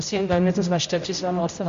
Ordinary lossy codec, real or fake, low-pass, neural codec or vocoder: MP3, 48 kbps; fake; 7.2 kHz; codec, 16 kHz, 0.5 kbps, X-Codec, HuBERT features, trained on general audio